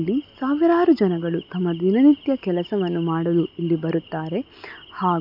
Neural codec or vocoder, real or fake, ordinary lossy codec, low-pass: none; real; none; 5.4 kHz